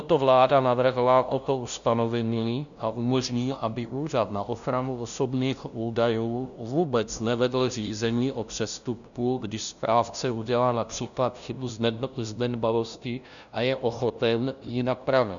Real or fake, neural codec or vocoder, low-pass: fake; codec, 16 kHz, 0.5 kbps, FunCodec, trained on LibriTTS, 25 frames a second; 7.2 kHz